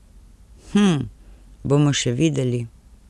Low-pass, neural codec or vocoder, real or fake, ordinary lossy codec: none; none; real; none